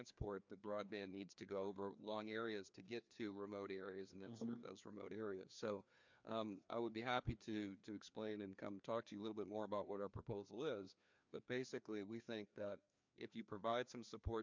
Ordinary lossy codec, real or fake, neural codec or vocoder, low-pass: MP3, 64 kbps; fake; codec, 16 kHz, 2 kbps, FreqCodec, larger model; 7.2 kHz